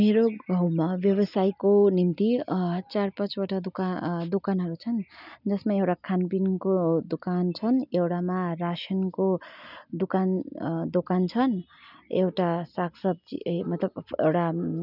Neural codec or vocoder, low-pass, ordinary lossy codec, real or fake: none; 5.4 kHz; none; real